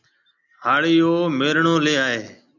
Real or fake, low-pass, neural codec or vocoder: real; 7.2 kHz; none